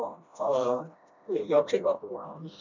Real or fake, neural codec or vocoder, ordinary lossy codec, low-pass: fake; codec, 16 kHz, 1 kbps, FreqCodec, smaller model; none; 7.2 kHz